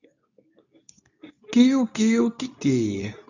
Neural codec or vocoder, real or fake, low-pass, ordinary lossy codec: codec, 24 kHz, 0.9 kbps, WavTokenizer, medium speech release version 2; fake; 7.2 kHz; none